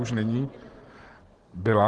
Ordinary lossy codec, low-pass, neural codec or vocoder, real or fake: Opus, 16 kbps; 10.8 kHz; vocoder, 44.1 kHz, 128 mel bands every 512 samples, BigVGAN v2; fake